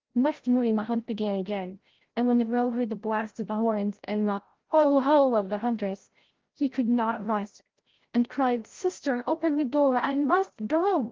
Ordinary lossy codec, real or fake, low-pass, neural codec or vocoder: Opus, 16 kbps; fake; 7.2 kHz; codec, 16 kHz, 0.5 kbps, FreqCodec, larger model